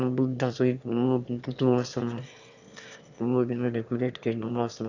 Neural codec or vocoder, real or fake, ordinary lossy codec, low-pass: autoencoder, 22.05 kHz, a latent of 192 numbers a frame, VITS, trained on one speaker; fake; none; 7.2 kHz